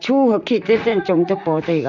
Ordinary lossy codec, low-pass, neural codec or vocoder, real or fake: none; 7.2 kHz; vocoder, 44.1 kHz, 128 mel bands, Pupu-Vocoder; fake